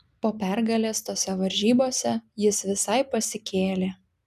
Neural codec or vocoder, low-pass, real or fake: none; 14.4 kHz; real